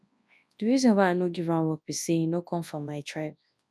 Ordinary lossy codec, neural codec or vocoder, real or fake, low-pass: none; codec, 24 kHz, 0.9 kbps, WavTokenizer, large speech release; fake; none